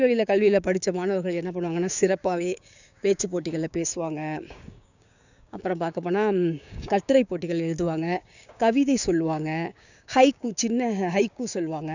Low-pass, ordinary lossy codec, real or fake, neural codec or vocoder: 7.2 kHz; none; fake; codec, 16 kHz, 6 kbps, DAC